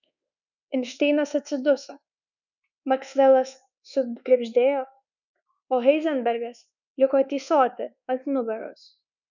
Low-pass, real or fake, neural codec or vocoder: 7.2 kHz; fake; codec, 24 kHz, 1.2 kbps, DualCodec